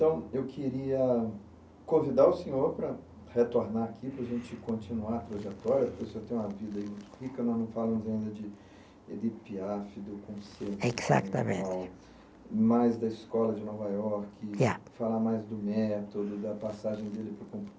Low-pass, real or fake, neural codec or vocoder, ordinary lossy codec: none; real; none; none